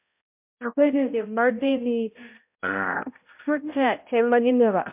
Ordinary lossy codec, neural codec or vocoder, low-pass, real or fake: MP3, 32 kbps; codec, 16 kHz, 0.5 kbps, X-Codec, HuBERT features, trained on balanced general audio; 3.6 kHz; fake